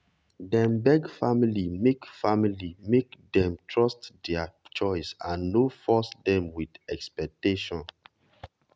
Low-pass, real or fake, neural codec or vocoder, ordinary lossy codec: none; real; none; none